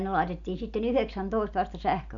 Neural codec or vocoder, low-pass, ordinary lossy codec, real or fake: none; 7.2 kHz; none; real